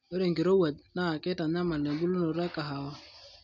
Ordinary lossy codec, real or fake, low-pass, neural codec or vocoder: none; real; 7.2 kHz; none